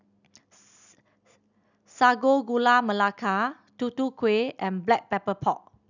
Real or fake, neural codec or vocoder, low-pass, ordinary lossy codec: real; none; 7.2 kHz; none